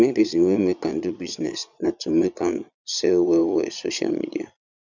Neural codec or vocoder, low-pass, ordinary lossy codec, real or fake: vocoder, 22.05 kHz, 80 mel bands, Vocos; 7.2 kHz; none; fake